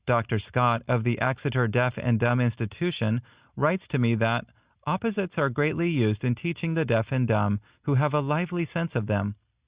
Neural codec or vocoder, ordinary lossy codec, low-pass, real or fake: none; Opus, 64 kbps; 3.6 kHz; real